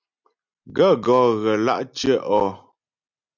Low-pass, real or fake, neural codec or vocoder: 7.2 kHz; real; none